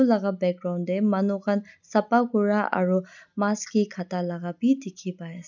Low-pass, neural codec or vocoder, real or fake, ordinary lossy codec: 7.2 kHz; none; real; none